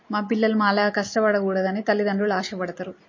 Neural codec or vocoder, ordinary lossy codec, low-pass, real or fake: none; MP3, 32 kbps; 7.2 kHz; real